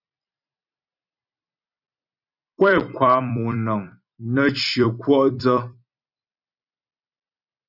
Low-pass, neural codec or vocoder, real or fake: 5.4 kHz; vocoder, 44.1 kHz, 128 mel bands every 256 samples, BigVGAN v2; fake